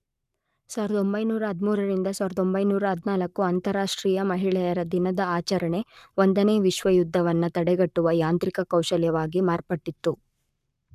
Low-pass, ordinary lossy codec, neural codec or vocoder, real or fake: 14.4 kHz; none; codec, 44.1 kHz, 7.8 kbps, Pupu-Codec; fake